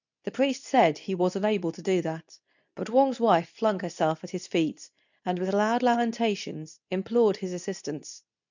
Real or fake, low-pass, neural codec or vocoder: fake; 7.2 kHz; codec, 24 kHz, 0.9 kbps, WavTokenizer, medium speech release version 2